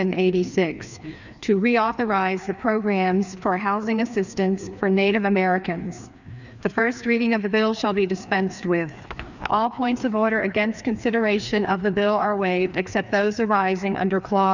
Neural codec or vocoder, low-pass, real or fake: codec, 16 kHz, 2 kbps, FreqCodec, larger model; 7.2 kHz; fake